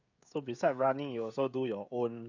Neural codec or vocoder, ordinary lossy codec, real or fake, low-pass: codec, 16 kHz, 16 kbps, FreqCodec, smaller model; none; fake; 7.2 kHz